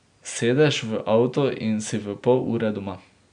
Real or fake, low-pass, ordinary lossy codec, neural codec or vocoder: real; 9.9 kHz; none; none